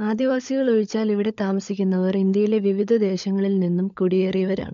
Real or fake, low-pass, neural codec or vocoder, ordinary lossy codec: fake; 7.2 kHz; codec, 16 kHz, 16 kbps, FunCodec, trained on LibriTTS, 50 frames a second; MP3, 64 kbps